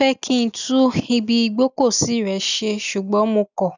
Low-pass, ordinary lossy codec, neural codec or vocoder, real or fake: 7.2 kHz; none; none; real